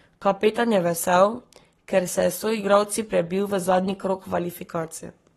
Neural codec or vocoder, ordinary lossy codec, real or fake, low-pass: codec, 44.1 kHz, 7.8 kbps, Pupu-Codec; AAC, 32 kbps; fake; 19.8 kHz